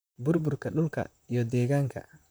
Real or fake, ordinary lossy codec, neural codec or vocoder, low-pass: fake; none; vocoder, 44.1 kHz, 128 mel bands, Pupu-Vocoder; none